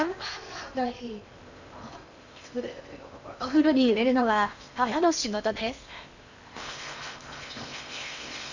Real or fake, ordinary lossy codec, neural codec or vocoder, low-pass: fake; none; codec, 16 kHz in and 24 kHz out, 0.8 kbps, FocalCodec, streaming, 65536 codes; 7.2 kHz